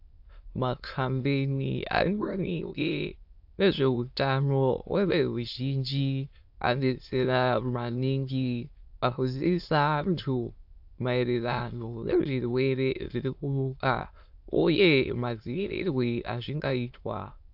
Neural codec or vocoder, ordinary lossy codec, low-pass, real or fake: autoencoder, 22.05 kHz, a latent of 192 numbers a frame, VITS, trained on many speakers; AAC, 48 kbps; 5.4 kHz; fake